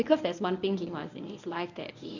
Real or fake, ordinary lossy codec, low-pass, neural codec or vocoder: fake; none; 7.2 kHz; codec, 24 kHz, 0.9 kbps, WavTokenizer, medium speech release version 1